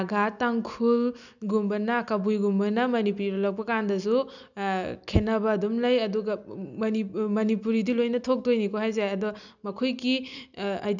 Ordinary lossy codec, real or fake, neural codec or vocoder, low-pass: none; real; none; 7.2 kHz